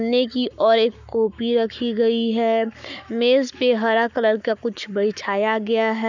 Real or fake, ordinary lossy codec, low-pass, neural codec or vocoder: fake; none; 7.2 kHz; codec, 44.1 kHz, 7.8 kbps, Pupu-Codec